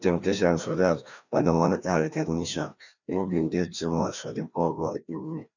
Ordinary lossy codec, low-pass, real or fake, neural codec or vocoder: none; 7.2 kHz; fake; codec, 16 kHz, 1 kbps, FreqCodec, larger model